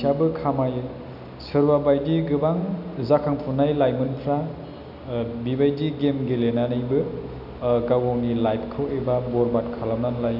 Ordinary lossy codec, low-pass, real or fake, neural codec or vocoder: none; 5.4 kHz; real; none